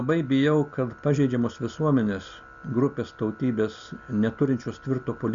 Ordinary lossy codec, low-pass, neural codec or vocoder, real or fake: Opus, 64 kbps; 7.2 kHz; none; real